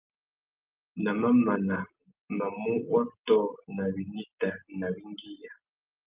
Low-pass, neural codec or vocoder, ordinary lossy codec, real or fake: 3.6 kHz; none; Opus, 32 kbps; real